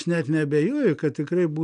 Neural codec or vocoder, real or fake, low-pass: none; real; 9.9 kHz